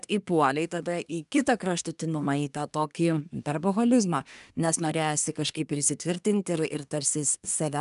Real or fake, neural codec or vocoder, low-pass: fake; codec, 24 kHz, 1 kbps, SNAC; 10.8 kHz